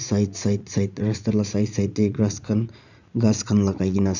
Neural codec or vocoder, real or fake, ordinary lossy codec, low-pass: vocoder, 44.1 kHz, 80 mel bands, Vocos; fake; none; 7.2 kHz